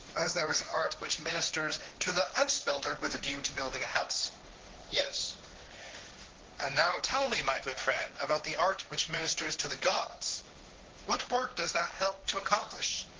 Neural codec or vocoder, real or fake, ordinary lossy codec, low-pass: codec, 16 kHz, 1.1 kbps, Voila-Tokenizer; fake; Opus, 16 kbps; 7.2 kHz